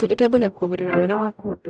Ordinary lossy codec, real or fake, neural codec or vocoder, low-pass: none; fake; codec, 44.1 kHz, 0.9 kbps, DAC; 9.9 kHz